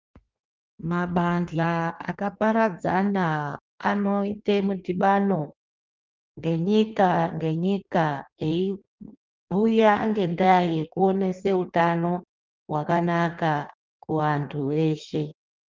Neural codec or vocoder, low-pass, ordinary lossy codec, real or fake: codec, 16 kHz in and 24 kHz out, 1.1 kbps, FireRedTTS-2 codec; 7.2 kHz; Opus, 24 kbps; fake